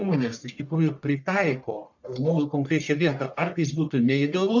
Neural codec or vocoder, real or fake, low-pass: codec, 44.1 kHz, 1.7 kbps, Pupu-Codec; fake; 7.2 kHz